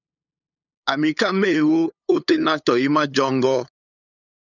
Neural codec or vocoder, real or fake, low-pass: codec, 16 kHz, 8 kbps, FunCodec, trained on LibriTTS, 25 frames a second; fake; 7.2 kHz